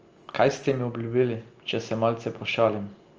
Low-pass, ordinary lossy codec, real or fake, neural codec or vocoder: 7.2 kHz; Opus, 24 kbps; real; none